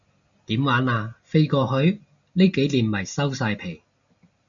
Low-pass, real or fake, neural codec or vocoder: 7.2 kHz; real; none